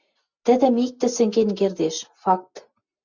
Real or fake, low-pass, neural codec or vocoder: real; 7.2 kHz; none